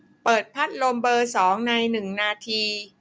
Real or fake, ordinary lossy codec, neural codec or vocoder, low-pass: real; none; none; none